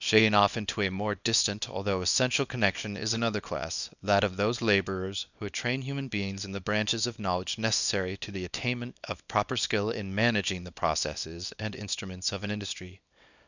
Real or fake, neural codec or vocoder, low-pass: fake; codec, 24 kHz, 0.9 kbps, WavTokenizer, small release; 7.2 kHz